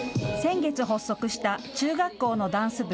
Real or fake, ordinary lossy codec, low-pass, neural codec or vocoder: real; none; none; none